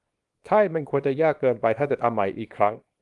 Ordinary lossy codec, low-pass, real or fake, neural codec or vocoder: Opus, 24 kbps; 10.8 kHz; fake; codec, 24 kHz, 0.9 kbps, WavTokenizer, small release